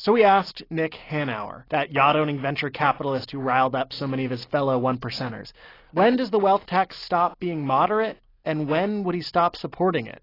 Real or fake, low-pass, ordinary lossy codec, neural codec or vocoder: real; 5.4 kHz; AAC, 24 kbps; none